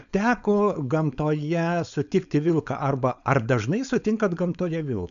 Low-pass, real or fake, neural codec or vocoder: 7.2 kHz; fake; codec, 16 kHz, 4.8 kbps, FACodec